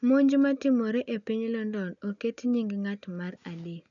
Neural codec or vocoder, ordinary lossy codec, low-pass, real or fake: none; none; 7.2 kHz; real